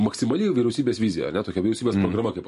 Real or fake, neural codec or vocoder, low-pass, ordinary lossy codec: real; none; 14.4 kHz; MP3, 48 kbps